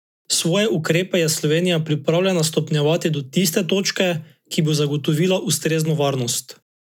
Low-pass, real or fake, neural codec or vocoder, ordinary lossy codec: 19.8 kHz; real; none; none